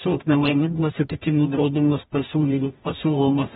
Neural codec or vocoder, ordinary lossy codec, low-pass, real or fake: codec, 44.1 kHz, 0.9 kbps, DAC; AAC, 16 kbps; 19.8 kHz; fake